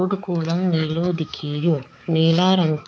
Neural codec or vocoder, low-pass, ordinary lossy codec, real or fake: codec, 16 kHz, 4 kbps, X-Codec, HuBERT features, trained on balanced general audio; none; none; fake